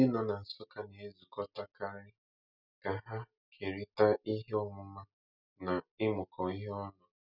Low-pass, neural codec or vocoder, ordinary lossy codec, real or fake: 5.4 kHz; none; none; real